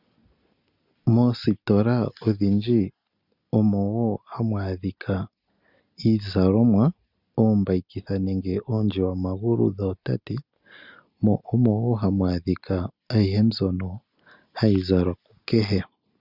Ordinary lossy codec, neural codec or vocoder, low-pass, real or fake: Opus, 64 kbps; none; 5.4 kHz; real